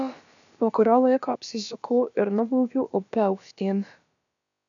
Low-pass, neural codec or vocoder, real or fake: 7.2 kHz; codec, 16 kHz, about 1 kbps, DyCAST, with the encoder's durations; fake